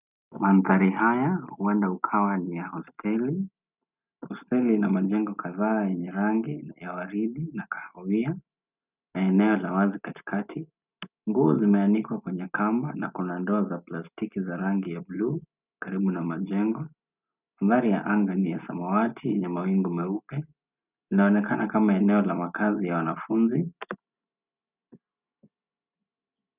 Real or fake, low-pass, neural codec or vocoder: real; 3.6 kHz; none